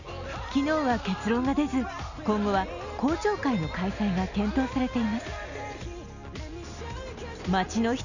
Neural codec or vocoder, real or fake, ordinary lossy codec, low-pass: none; real; none; 7.2 kHz